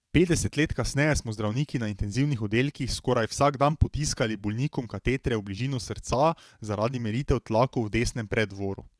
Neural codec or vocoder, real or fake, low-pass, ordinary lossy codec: vocoder, 22.05 kHz, 80 mel bands, WaveNeXt; fake; none; none